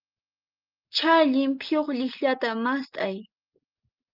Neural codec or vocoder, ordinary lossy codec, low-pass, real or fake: none; Opus, 32 kbps; 5.4 kHz; real